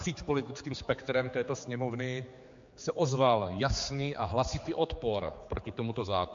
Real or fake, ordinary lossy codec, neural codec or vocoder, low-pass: fake; MP3, 48 kbps; codec, 16 kHz, 4 kbps, X-Codec, HuBERT features, trained on general audio; 7.2 kHz